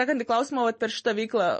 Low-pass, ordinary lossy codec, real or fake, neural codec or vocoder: 10.8 kHz; MP3, 32 kbps; real; none